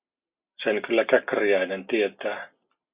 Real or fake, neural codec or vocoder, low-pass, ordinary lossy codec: real; none; 3.6 kHz; Opus, 64 kbps